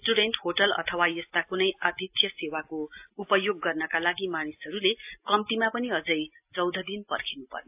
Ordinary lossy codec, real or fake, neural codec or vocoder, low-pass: AAC, 32 kbps; real; none; 3.6 kHz